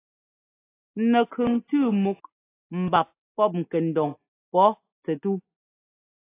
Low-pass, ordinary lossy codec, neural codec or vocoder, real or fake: 3.6 kHz; AAC, 16 kbps; none; real